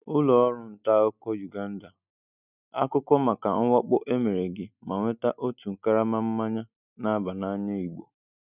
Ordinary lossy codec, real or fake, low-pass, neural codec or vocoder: none; real; 3.6 kHz; none